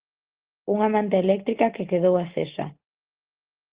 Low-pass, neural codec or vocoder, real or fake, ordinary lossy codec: 3.6 kHz; none; real; Opus, 16 kbps